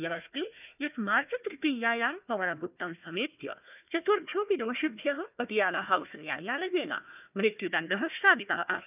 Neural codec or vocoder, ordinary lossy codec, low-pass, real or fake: codec, 16 kHz, 1 kbps, FunCodec, trained on Chinese and English, 50 frames a second; none; 3.6 kHz; fake